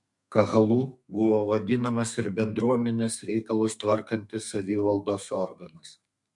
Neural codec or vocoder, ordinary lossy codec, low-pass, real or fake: codec, 32 kHz, 1.9 kbps, SNAC; MP3, 64 kbps; 10.8 kHz; fake